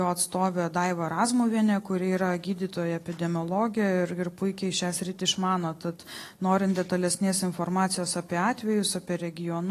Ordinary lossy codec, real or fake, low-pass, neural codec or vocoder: AAC, 48 kbps; real; 14.4 kHz; none